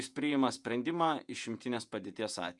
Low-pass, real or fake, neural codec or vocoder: 10.8 kHz; fake; vocoder, 48 kHz, 128 mel bands, Vocos